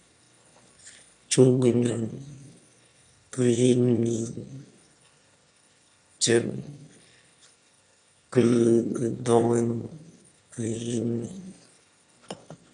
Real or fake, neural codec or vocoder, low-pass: fake; autoencoder, 22.05 kHz, a latent of 192 numbers a frame, VITS, trained on one speaker; 9.9 kHz